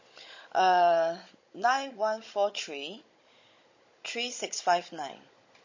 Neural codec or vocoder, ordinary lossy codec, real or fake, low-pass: codec, 16 kHz, 16 kbps, FunCodec, trained on Chinese and English, 50 frames a second; MP3, 32 kbps; fake; 7.2 kHz